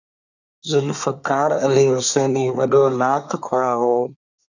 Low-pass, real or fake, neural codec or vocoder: 7.2 kHz; fake; codec, 24 kHz, 1 kbps, SNAC